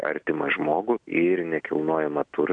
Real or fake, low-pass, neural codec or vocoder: real; 9.9 kHz; none